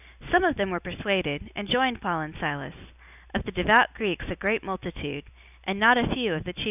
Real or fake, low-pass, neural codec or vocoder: real; 3.6 kHz; none